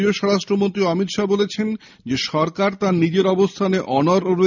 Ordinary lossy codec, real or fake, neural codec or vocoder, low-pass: none; real; none; 7.2 kHz